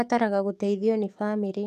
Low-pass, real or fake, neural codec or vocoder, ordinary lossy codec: 14.4 kHz; fake; codec, 44.1 kHz, 7.8 kbps, DAC; none